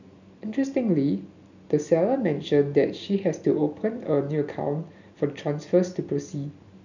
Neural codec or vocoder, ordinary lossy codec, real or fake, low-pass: none; none; real; 7.2 kHz